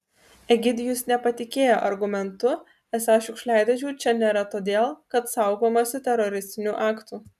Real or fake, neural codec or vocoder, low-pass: real; none; 14.4 kHz